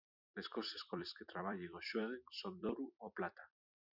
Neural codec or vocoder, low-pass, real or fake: vocoder, 44.1 kHz, 128 mel bands every 256 samples, BigVGAN v2; 5.4 kHz; fake